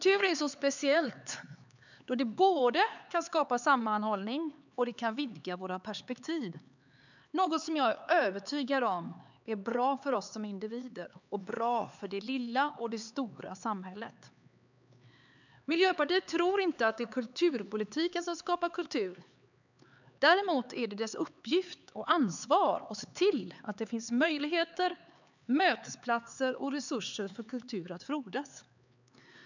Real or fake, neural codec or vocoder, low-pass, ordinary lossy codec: fake; codec, 16 kHz, 4 kbps, X-Codec, HuBERT features, trained on LibriSpeech; 7.2 kHz; none